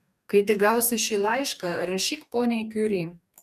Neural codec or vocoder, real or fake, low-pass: codec, 44.1 kHz, 2.6 kbps, DAC; fake; 14.4 kHz